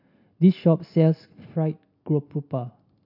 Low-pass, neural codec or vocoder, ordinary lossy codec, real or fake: 5.4 kHz; none; none; real